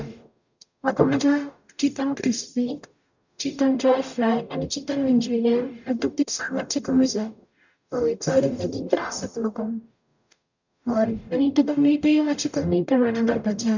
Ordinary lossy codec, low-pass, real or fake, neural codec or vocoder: none; 7.2 kHz; fake; codec, 44.1 kHz, 0.9 kbps, DAC